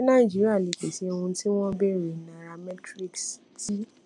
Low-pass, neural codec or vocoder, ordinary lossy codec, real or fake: 10.8 kHz; none; none; real